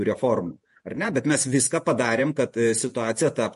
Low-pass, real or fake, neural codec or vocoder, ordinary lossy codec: 14.4 kHz; fake; vocoder, 48 kHz, 128 mel bands, Vocos; MP3, 48 kbps